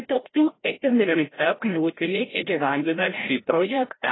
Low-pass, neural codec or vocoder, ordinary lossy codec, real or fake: 7.2 kHz; codec, 16 kHz, 0.5 kbps, FreqCodec, larger model; AAC, 16 kbps; fake